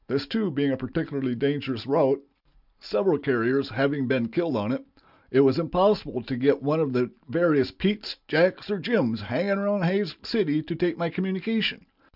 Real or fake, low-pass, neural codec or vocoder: real; 5.4 kHz; none